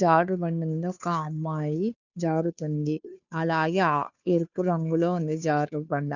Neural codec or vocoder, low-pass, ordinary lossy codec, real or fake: codec, 16 kHz, 2 kbps, FunCodec, trained on Chinese and English, 25 frames a second; 7.2 kHz; none; fake